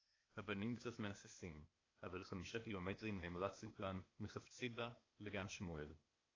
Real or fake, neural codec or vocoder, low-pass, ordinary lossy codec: fake; codec, 16 kHz, 0.8 kbps, ZipCodec; 7.2 kHz; AAC, 32 kbps